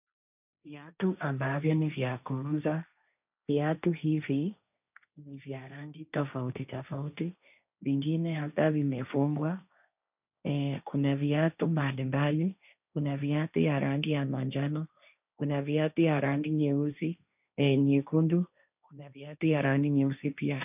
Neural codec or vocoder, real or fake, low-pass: codec, 16 kHz, 1.1 kbps, Voila-Tokenizer; fake; 3.6 kHz